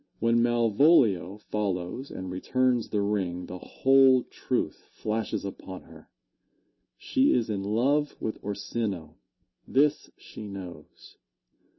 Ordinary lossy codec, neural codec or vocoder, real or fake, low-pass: MP3, 24 kbps; none; real; 7.2 kHz